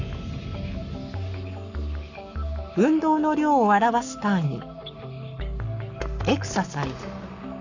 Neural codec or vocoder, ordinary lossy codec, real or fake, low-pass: codec, 44.1 kHz, 7.8 kbps, Pupu-Codec; none; fake; 7.2 kHz